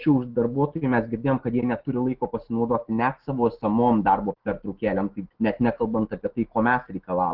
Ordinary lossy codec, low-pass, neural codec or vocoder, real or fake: Opus, 16 kbps; 5.4 kHz; none; real